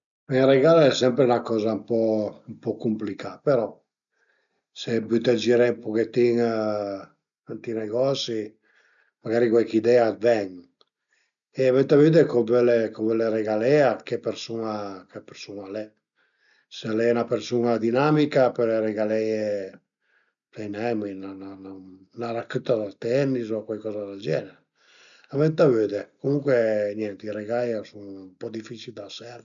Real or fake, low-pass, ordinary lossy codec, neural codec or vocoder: real; 7.2 kHz; none; none